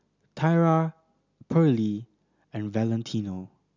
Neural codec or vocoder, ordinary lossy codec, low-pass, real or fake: none; none; 7.2 kHz; real